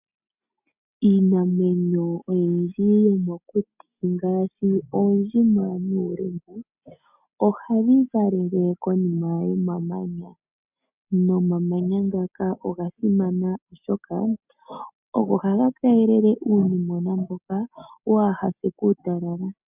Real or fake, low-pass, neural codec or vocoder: real; 3.6 kHz; none